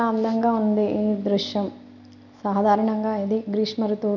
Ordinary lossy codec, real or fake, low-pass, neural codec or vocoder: none; real; 7.2 kHz; none